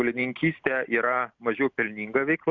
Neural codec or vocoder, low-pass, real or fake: none; 7.2 kHz; real